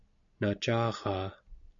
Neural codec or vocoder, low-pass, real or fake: none; 7.2 kHz; real